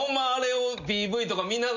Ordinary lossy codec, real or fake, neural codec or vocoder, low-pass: none; real; none; 7.2 kHz